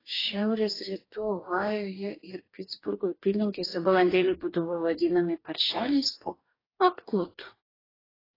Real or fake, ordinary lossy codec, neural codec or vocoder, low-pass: fake; AAC, 24 kbps; codec, 44.1 kHz, 2.6 kbps, DAC; 5.4 kHz